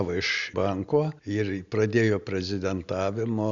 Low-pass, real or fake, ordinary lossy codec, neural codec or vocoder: 7.2 kHz; real; Opus, 64 kbps; none